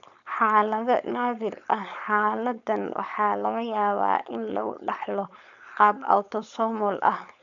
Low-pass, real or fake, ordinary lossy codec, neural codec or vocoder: 7.2 kHz; fake; none; codec, 16 kHz, 4.8 kbps, FACodec